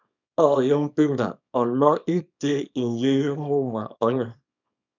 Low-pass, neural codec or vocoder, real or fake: 7.2 kHz; codec, 24 kHz, 0.9 kbps, WavTokenizer, small release; fake